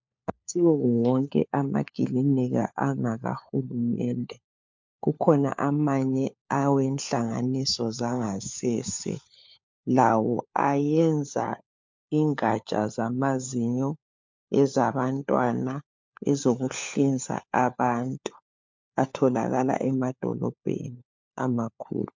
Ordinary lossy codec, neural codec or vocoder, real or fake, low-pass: MP3, 64 kbps; codec, 16 kHz, 4 kbps, FunCodec, trained on LibriTTS, 50 frames a second; fake; 7.2 kHz